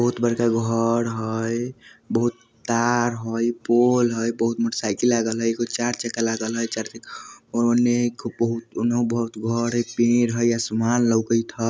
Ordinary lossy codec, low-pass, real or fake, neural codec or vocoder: none; none; real; none